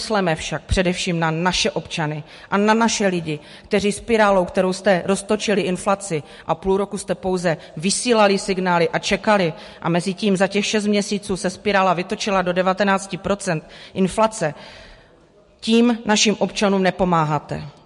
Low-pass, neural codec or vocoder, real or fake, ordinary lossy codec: 14.4 kHz; none; real; MP3, 48 kbps